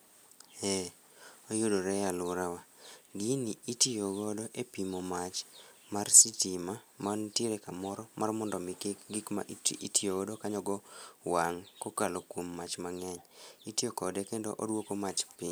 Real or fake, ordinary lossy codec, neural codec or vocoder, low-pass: real; none; none; none